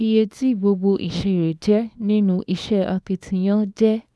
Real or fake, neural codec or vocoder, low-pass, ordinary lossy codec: fake; codec, 24 kHz, 0.9 kbps, WavTokenizer, small release; none; none